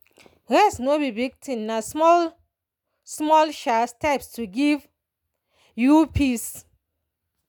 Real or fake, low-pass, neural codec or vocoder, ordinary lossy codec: real; 19.8 kHz; none; none